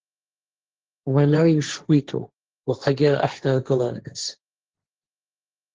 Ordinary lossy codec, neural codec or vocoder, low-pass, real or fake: Opus, 16 kbps; codec, 16 kHz, 1.1 kbps, Voila-Tokenizer; 7.2 kHz; fake